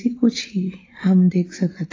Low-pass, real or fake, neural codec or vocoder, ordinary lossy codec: 7.2 kHz; real; none; AAC, 32 kbps